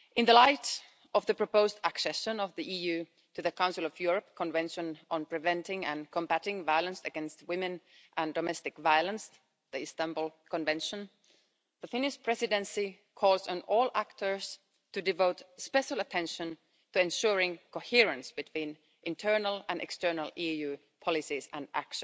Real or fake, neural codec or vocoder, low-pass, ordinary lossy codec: real; none; none; none